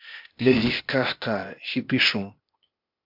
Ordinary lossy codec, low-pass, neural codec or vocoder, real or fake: MP3, 48 kbps; 5.4 kHz; codec, 16 kHz, 0.8 kbps, ZipCodec; fake